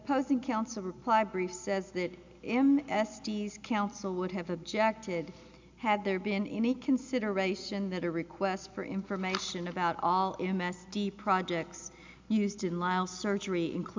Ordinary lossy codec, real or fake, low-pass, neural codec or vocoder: MP3, 64 kbps; real; 7.2 kHz; none